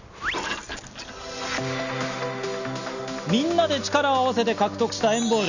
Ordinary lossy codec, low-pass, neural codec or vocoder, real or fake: none; 7.2 kHz; none; real